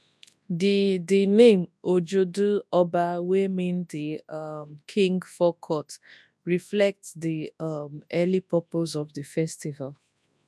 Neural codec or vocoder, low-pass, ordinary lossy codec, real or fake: codec, 24 kHz, 0.9 kbps, WavTokenizer, large speech release; none; none; fake